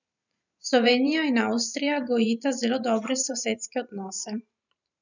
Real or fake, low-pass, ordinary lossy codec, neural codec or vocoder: fake; 7.2 kHz; none; vocoder, 44.1 kHz, 128 mel bands every 512 samples, BigVGAN v2